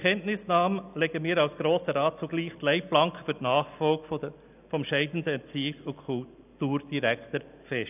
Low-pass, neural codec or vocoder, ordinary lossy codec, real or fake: 3.6 kHz; none; none; real